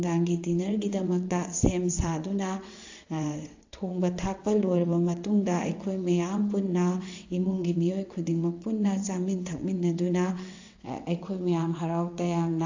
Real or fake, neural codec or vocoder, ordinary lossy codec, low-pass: fake; vocoder, 22.05 kHz, 80 mel bands, WaveNeXt; AAC, 48 kbps; 7.2 kHz